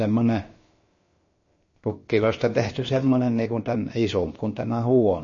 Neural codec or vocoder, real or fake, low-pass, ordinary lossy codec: codec, 16 kHz, about 1 kbps, DyCAST, with the encoder's durations; fake; 7.2 kHz; MP3, 32 kbps